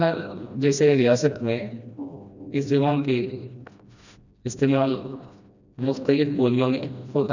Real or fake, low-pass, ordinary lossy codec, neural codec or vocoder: fake; 7.2 kHz; none; codec, 16 kHz, 1 kbps, FreqCodec, smaller model